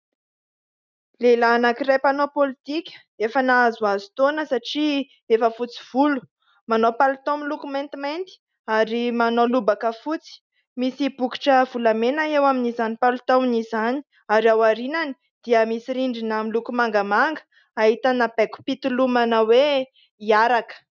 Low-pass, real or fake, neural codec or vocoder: 7.2 kHz; real; none